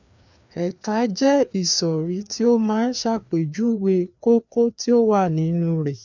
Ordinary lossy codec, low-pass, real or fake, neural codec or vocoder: none; 7.2 kHz; fake; codec, 16 kHz, 2 kbps, FreqCodec, larger model